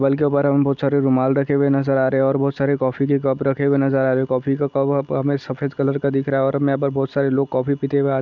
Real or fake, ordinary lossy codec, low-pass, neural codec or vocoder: real; none; 7.2 kHz; none